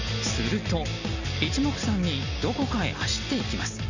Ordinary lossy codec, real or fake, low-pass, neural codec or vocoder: Opus, 64 kbps; real; 7.2 kHz; none